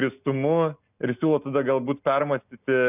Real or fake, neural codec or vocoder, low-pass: real; none; 3.6 kHz